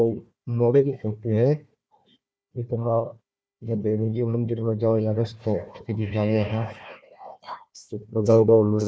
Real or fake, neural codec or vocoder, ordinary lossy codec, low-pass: fake; codec, 16 kHz, 1 kbps, FunCodec, trained on Chinese and English, 50 frames a second; none; none